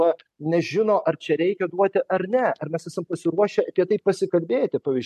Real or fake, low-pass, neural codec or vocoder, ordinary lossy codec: real; 14.4 kHz; none; MP3, 64 kbps